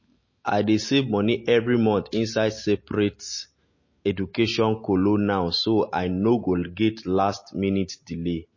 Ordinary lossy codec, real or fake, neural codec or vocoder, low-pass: MP3, 32 kbps; real; none; 7.2 kHz